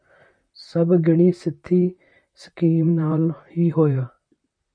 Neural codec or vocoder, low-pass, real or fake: vocoder, 44.1 kHz, 128 mel bands, Pupu-Vocoder; 9.9 kHz; fake